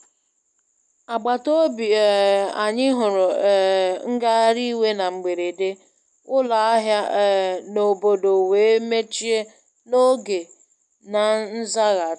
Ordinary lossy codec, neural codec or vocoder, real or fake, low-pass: none; none; real; 10.8 kHz